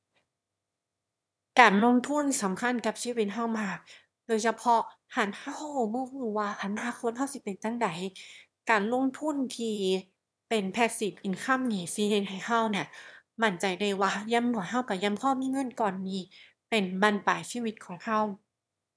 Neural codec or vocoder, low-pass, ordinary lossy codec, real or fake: autoencoder, 22.05 kHz, a latent of 192 numbers a frame, VITS, trained on one speaker; none; none; fake